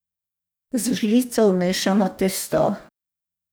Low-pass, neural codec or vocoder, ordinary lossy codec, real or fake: none; codec, 44.1 kHz, 2.6 kbps, DAC; none; fake